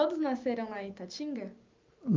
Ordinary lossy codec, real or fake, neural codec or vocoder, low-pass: Opus, 24 kbps; real; none; 7.2 kHz